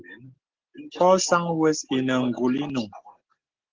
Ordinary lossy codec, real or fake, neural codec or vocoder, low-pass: Opus, 16 kbps; real; none; 7.2 kHz